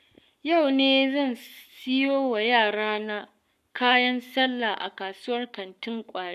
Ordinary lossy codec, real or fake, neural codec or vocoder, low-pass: none; fake; codec, 44.1 kHz, 7.8 kbps, Pupu-Codec; 14.4 kHz